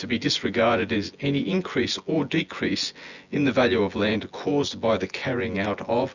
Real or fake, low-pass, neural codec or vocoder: fake; 7.2 kHz; vocoder, 24 kHz, 100 mel bands, Vocos